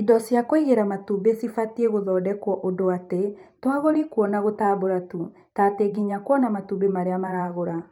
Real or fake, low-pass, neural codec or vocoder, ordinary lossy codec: fake; 19.8 kHz; vocoder, 44.1 kHz, 128 mel bands every 512 samples, BigVGAN v2; none